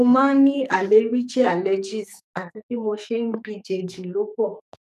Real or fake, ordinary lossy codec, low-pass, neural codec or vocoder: fake; none; 14.4 kHz; codec, 44.1 kHz, 2.6 kbps, SNAC